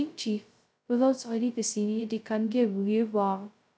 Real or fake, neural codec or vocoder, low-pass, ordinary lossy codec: fake; codec, 16 kHz, 0.2 kbps, FocalCodec; none; none